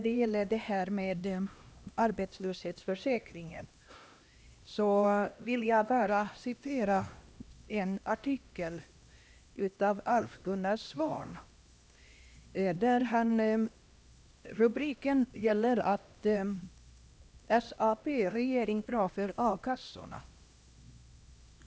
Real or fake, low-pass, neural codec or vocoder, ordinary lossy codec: fake; none; codec, 16 kHz, 1 kbps, X-Codec, HuBERT features, trained on LibriSpeech; none